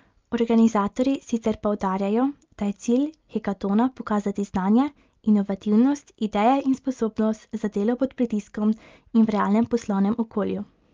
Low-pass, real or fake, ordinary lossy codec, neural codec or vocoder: 7.2 kHz; real; Opus, 32 kbps; none